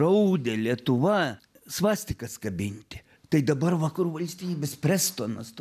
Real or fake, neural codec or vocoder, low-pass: real; none; 14.4 kHz